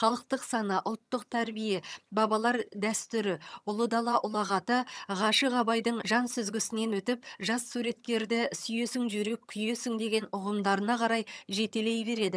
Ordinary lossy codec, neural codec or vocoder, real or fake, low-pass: none; vocoder, 22.05 kHz, 80 mel bands, HiFi-GAN; fake; none